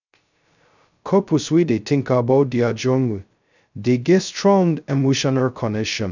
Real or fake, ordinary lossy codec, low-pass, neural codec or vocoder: fake; none; 7.2 kHz; codec, 16 kHz, 0.2 kbps, FocalCodec